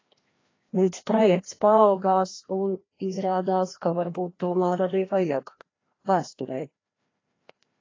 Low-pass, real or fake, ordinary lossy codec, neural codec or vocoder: 7.2 kHz; fake; AAC, 32 kbps; codec, 16 kHz, 1 kbps, FreqCodec, larger model